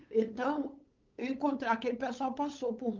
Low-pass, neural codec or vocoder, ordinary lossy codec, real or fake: 7.2 kHz; codec, 16 kHz, 8 kbps, FunCodec, trained on LibriTTS, 25 frames a second; Opus, 24 kbps; fake